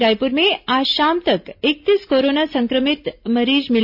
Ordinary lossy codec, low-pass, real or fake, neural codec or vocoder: none; 5.4 kHz; real; none